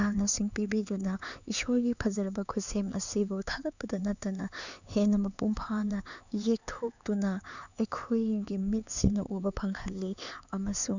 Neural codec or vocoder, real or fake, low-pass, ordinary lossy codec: codec, 16 kHz, 4 kbps, X-Codec, HuBERT features, trained on LibriSpeech; fake; 7.2 kHz; none